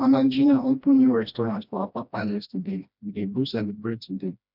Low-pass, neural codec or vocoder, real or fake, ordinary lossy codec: 5.4 kHz; codec, 16 kHz, 1 kbps, FreqCodec, smaller model; fake; none